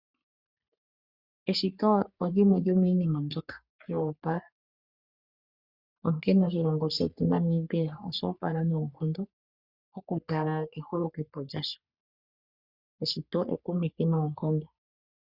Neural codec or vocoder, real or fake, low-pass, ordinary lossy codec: codec, 44.1 kHz, 3.4 kbps, Pupu-Codec; fake; 5.4 kHz; Opus, 64 kbps